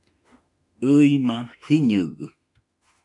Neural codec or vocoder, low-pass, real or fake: autoencoder, 48 kHz, 32 numbers a frame, DAC-VAE, trained on Japanese speech; 10.8 kHz; fake